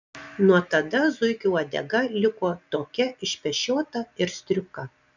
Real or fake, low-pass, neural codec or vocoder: real; 7.2 kHz; none